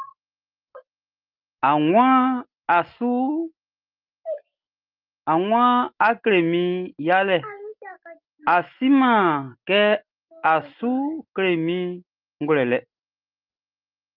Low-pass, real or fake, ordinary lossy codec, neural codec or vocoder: 5.4 kHz; real; Opus, 32 kbps; none